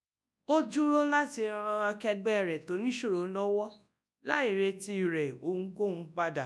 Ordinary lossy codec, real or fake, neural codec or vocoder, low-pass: none; fake; codec, 24 kHz, 0.9 kbps, WavTokenizer, large speech release; none